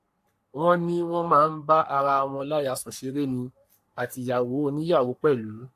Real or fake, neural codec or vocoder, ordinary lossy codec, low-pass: fake; codec, 44.1 kHz, 3.4 kbps, Pupu-Codec; AAC, 64 kbps; 14.4 kHz